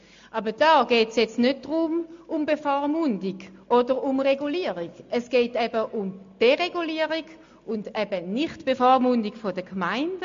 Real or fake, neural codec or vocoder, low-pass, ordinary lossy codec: real; none; 7.2 kHz; MP3, 48 kbps